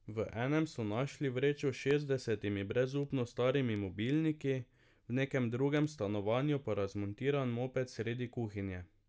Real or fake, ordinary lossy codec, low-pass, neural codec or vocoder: real; none; none; none